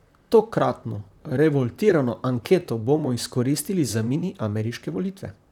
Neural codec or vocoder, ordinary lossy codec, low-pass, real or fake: vocoder, 44.1 kHz, 128 mel bands, Pupu-Vocoder; none; 19.8 kHz; fake